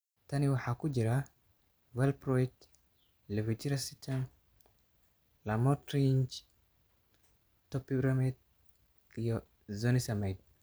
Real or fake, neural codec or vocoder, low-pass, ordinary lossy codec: fake; vocoder, 44.1 kHz, 128 mel bands every 512 samples, BigVGAN v2; none; none